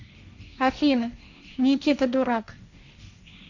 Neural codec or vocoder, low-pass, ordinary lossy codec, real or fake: codec, 16 kHz, 1.1 kbps, Voila-Tokenizer; 7.2 kHz; none; fake